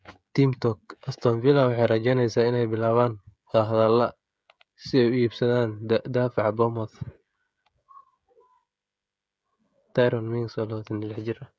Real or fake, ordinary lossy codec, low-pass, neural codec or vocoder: fake; none; none; codec, 16 kHz, 16 kbps, FreqCodec, smaller model